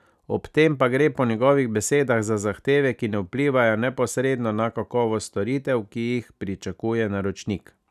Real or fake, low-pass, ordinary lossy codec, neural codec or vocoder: real; 14.4 kHz; none; none